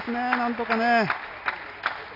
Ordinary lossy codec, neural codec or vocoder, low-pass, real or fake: none; none; 5.4 kHz; real